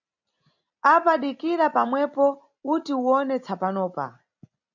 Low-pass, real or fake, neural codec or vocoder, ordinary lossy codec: 7.2 kHz; real; none; AAC, 48 kbps